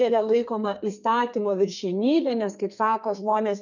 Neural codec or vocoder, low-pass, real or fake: codec, 32 kHz, 1.9 kbps, SNAC; 7.2 kHz; fake